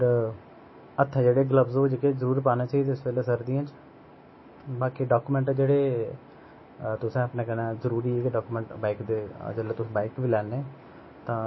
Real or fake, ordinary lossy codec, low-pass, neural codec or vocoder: real; MP3, 24 kbps; 7.2 kHz; none